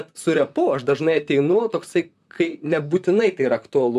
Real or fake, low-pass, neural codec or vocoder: fake; 14.4 kHz; vocoder, 44.1 kHz, 128 mel bands, Pupu-Vocoder